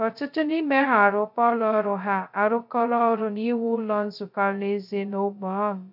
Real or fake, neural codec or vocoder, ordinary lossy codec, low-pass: fake; codec, 16 kHz, 0.2 kbps, FocalCodec; none; 5.4 kHz